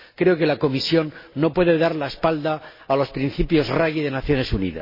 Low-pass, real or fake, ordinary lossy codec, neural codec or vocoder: 5.4 kHz; real; MP3, 24 kbps; none